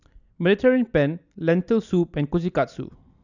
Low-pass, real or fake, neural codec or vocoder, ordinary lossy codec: 7.2 kHz; real; none; none